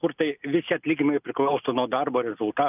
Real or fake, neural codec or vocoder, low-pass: real; none; 3.6 kHz